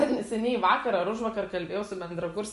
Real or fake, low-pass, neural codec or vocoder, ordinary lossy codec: real; 14.4 kHz; none; MP3, 48 kbps